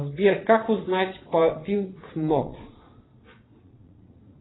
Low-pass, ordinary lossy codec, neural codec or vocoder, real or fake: 7.2 kHz; AAC, 16 kbps; vocoder, 22.05 kHz, 80 mel bands, Vocos; fake